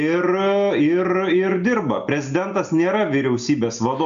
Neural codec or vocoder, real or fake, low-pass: none; real; 7.2 kHz